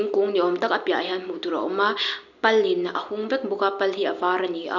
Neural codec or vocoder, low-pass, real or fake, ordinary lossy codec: none; 7.2 kHz; real; none